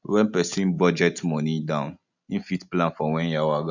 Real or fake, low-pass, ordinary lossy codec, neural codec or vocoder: real; 7.2 kHz; none; none